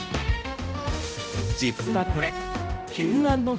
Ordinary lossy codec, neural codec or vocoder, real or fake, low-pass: none; codec, 16 kHz, 0.5 kbps, X-Codec, HuBERT features, trained on balanced general audio; fake; none